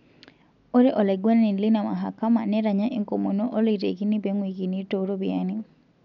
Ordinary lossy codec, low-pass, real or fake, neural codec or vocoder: none; 7.2 kHz; real; none